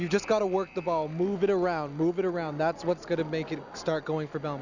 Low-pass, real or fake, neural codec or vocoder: 7.2 kHz; real; none